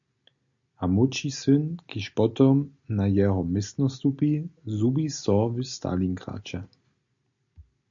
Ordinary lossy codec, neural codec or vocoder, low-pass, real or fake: MP3, 64 kbps; none; 7.2 kHz; real